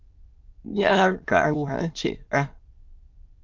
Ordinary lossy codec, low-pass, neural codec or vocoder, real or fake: Opus, 24 kbps; 7.2 kHz; autoencoder, 22.05 kHz, a latent of 192 numbers a frame, VITS, trained on many speakers; fake